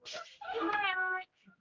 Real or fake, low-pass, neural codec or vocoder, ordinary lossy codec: fake; 7.2 kHz; codec, 16 kHz, 0.5 kbps, X-Codec, HuBERT features, trained on general audio; Opus, 24 kbps